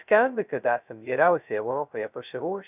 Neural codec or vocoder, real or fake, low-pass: codec, 16 kHz, 0.2 kbps, FocalCodec; fake; 3.6 kHz